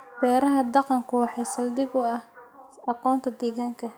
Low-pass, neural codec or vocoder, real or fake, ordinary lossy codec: none; codec, 44.1 kHz, 7.8 kbps, DAC; fake; none